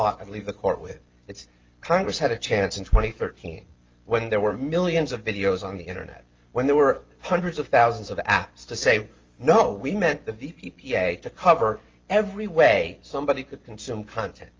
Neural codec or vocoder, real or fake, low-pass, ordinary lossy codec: none; real; 7.2 kHz; Opus, 32 kbps